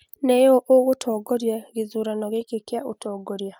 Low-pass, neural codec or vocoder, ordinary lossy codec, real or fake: none; none; none; real